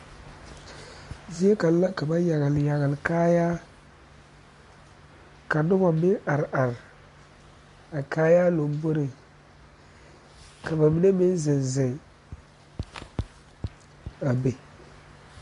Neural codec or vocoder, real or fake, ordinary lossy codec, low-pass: none; real; MP3, 48 kbps; 10.8 kHz